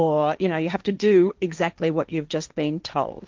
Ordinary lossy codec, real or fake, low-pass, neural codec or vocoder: Opus, 24 kbps; fake; 7.2 kHz; codec, 16 kHz, 1.1 kbps, Voila-Tokenizer